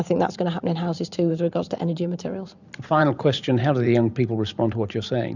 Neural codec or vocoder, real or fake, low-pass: vocoder, 44.1 kHz, 128 mel bands every 512 samples, BigVGAN v2; fake; 7.2 kHz